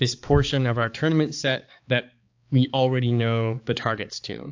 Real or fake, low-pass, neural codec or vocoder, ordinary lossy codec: fake; 7.2 kHz; codec, 16 kHz, 4 kbps, X-Codec, HuBERT features, trained on balanced general audio; AAC, 48 kbps